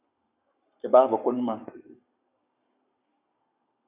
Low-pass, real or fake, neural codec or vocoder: 3.6 kHz; fake; codec, 44.1 kHz, 7.8 kbps, Pupu-Codec